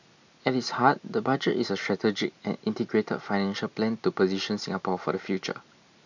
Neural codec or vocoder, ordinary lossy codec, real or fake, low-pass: none; none; real; 7.2 kHz